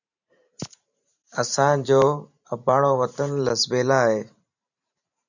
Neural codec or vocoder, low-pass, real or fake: vocoder, 44.1 kHz, 128 mel bands every 512 samples, BigVGAN v2; 7.2 kHz; fake